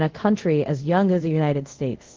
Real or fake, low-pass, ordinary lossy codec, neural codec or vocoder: fake; 7.2 kHz; Opus, 16 kbps; codec, 24 kHz, 0.5 kbps, DualCodec